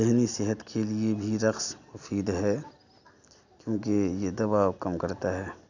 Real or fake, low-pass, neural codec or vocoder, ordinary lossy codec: real; 7.2 kHz; none; none